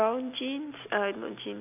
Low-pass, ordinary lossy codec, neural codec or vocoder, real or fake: 3.6 kHz; none; none; real